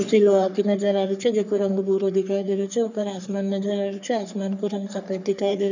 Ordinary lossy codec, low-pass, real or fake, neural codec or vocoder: none; 7.2 kHz; fake; codec, 44.1 kHz, 3.4 kbps, Pupu-Codec